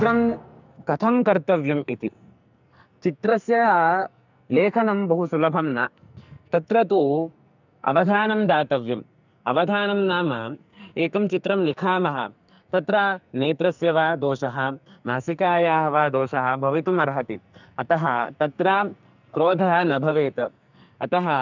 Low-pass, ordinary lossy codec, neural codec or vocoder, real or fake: 7.2 kHz; none; codec, 44.1 kHz, 2.6 kbps, SNAC; fake